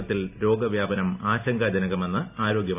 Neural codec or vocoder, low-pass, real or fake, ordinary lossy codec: none; 3.6 kHz; real; none